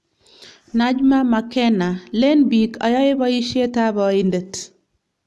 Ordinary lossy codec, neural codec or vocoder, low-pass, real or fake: none; none; none; real